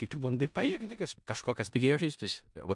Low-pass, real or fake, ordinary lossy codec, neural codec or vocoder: 10.8 kHz; fake; MP3, 96 kbps; codec, 16 kHz in and 24 kHz out, 0.4 kbps, LongCat-Audio-Codec, four codebook decoder